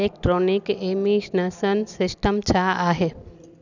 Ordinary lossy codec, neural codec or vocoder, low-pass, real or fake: none; none; 7.2 kHz; real